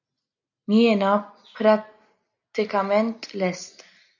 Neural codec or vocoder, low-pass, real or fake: none; 7.2 kHz; real